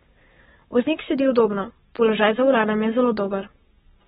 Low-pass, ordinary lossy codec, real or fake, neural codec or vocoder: 19.8 kHz; AAC, 16 kbps; fake; codec, 44.1 kHz, 7.8 kbps, Pupu-Codec